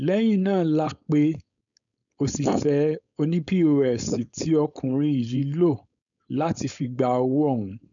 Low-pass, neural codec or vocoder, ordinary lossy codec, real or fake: 7.2 kHz; codec, 16 kHz, 4.8 kbps, FACodec; none; fake